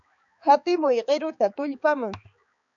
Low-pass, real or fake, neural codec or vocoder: 7.2 kHz; fake; codec, 16 kHz, 4 kbps, X-Codec, HuBERT features, trained on balanced general audio